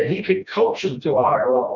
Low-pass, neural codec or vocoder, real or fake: 7.2 kHz; codec, 16 kHz, 1 kbps, FreqCodec, smaller model; fake